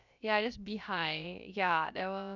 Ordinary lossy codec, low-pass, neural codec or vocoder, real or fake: none; 7.2 kHz; codec, 16 kHz, about 1 kbps, DyCAST, with the encoder's durations; fake